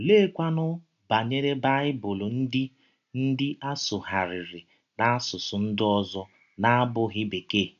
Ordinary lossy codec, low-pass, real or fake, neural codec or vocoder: none; 7.2 kHz; real; none